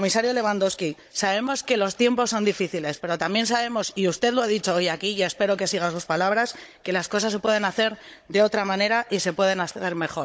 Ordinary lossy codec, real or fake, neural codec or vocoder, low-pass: none; fake; codec, 16 kHz, 16 kbps, FunCodec, trained on Chinese and English, 50 frames a second; none